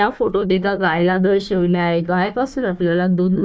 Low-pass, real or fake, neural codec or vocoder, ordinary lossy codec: none; fake; codec, 16 kHz, 1 kbps, FunCodec, trained on Chinese and English, 50 frames a second; none